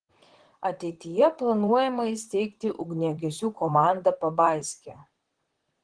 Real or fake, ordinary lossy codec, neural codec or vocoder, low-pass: fake; Opus, 16 kbps; vocoder, 44.1 kHz, 128 mel bands, Pupu-Vocoder; 9.9 kHz